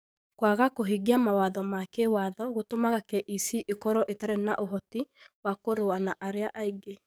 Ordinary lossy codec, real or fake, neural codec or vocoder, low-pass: none; fake; codec, 44.1 kHz, 7.8 kbps, DAC; none